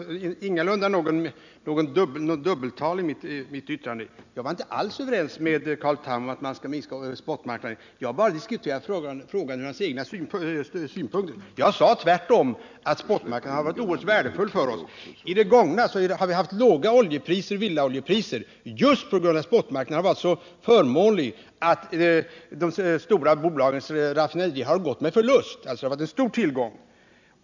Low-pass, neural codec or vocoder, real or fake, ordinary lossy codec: 7.2 kHz; none; real; none